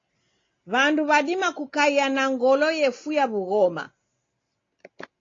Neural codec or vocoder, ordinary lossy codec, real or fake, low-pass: none; AAC, 32 kbps; real; 7.2 kHz